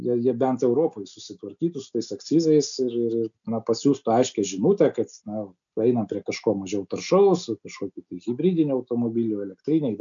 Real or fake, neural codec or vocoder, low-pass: real; none; 7.2 kHz